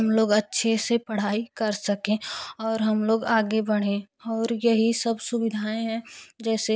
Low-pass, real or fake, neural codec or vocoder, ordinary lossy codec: none; real; none; none